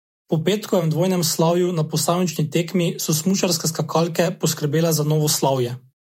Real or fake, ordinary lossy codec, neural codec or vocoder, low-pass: fake; MP3, 64 kbps; vocoder, 48 kHz, 128 mel bands, Vocos; 19.8 kHz